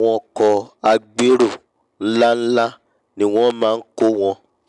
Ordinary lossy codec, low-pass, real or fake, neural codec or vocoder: none; 10.8 kHz; real; none